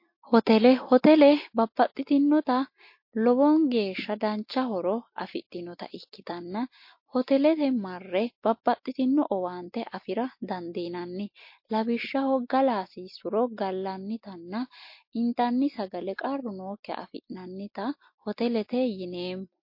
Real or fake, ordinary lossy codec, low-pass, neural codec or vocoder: real; MP3, 32 kbps; 5.4 kHz; none